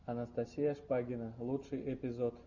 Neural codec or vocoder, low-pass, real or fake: none; 7.2 kHz; real